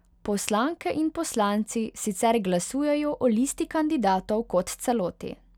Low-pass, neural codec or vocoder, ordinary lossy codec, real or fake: 19.8 kHz; none; none; real